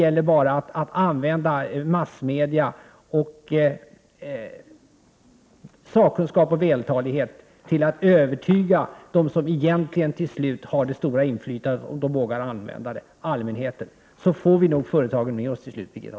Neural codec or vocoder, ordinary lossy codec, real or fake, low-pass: none; none; real; none